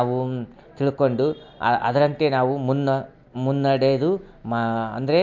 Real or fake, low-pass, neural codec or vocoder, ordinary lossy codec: real; 7.2 kHz; none; MP3, 48 kbps